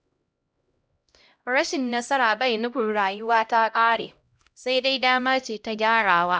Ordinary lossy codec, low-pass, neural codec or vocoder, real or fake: none; none; codec, 16 kHz, 0.5 kbps, X-Codec, HuBERT features, trained on LibriSpeech; fake